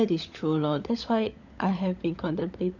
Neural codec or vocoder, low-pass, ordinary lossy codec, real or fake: codec, 16 kHz, 16 kbps, FunCodec, trained on LibriTTS, 50 frames a second; 7.2 kHz; none; fake